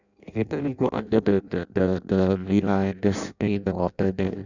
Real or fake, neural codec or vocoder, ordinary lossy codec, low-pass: fake; codec, 16 kHz in and 24 kHz out, 0.6 kbps, FireRedTTS-2 codec; none; 7.2 kHz